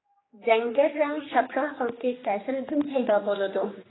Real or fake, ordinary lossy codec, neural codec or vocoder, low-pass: fake; AAC, 16 kbps; codec, 16 kHz, 2 kbps, X-Codec, HuBERT features, trained on general audio; 7.2 kHz